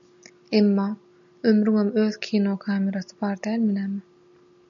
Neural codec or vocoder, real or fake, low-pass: none; real; 7.2 kHz